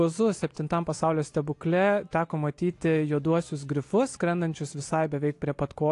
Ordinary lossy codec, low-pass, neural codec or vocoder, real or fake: AAC, 48 kbps; 10.8 kHz; none; real